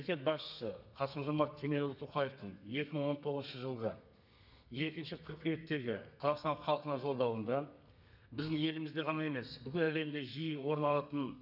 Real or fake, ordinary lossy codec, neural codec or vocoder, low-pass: fake; none; codec, 32 kHz, 1.9 kbps, SNAC; 5.4 kHz